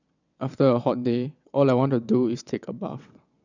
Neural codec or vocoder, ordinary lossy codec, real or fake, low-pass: vocoder, 22.05 kHz, 80 mel bands, WaveNeXt; none; fake; 7.2 kHz